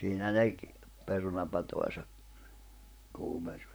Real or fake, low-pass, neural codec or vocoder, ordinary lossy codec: fake; none; vocoder, 44.1 kHz, 128 mel bands, Pupu-Vocoder; none